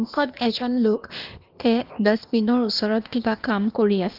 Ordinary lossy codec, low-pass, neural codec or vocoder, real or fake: Opus, 32 kbps; 5.4 kHz; codec, 16 kHz, 0.8 kbps, ZipCodec; fake